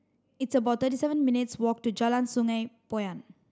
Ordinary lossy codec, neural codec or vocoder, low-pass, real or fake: none; none; none; real